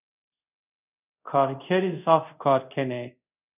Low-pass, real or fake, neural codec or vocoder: 3.6 kHz; fake; codec, 24 kHz, 0.5 kbps, DualCodec